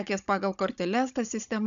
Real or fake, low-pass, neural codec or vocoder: fake; 7.2 kHz; codec, 16 kHz, 16 kbps, FunCodec, trained on Chinese and English, 50 frames a second